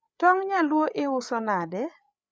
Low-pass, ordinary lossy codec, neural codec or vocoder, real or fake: none; none; codec, 16 kHz, 8 kbps, FreqCodec, larger model; fake